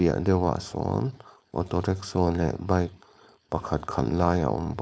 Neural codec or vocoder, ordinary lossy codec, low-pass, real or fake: codec, 16 kHz, 4.8 kbps, FACodec; none; none; fake